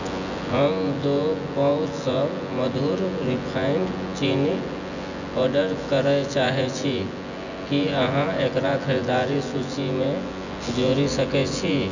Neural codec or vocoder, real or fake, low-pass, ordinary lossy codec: vocoder, 24 kHz, 100 mel bands, Vocos; fake; 7.2 kHz; none